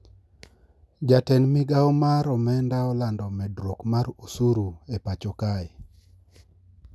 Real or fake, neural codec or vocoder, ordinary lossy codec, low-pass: real; none; none; none